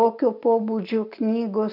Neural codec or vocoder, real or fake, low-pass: none; real; 5.4 kHz